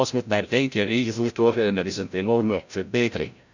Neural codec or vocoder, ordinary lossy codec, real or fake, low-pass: codec, 16 kHz, 0.5 kbps, FreqCodec, larger model; none; fake; 7.2 kHz